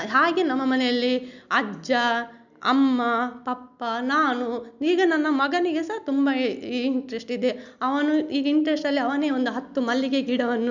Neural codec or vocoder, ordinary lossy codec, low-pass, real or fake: none; none; 7.2 kHz; real